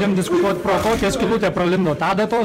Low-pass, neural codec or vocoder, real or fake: 19.8 kHz; vocoder, 44.1 kHz, 128 mel bands every 256 samples, BigVGAN v2; fake